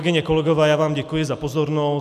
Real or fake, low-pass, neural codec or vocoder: real; 14.4 kHz; none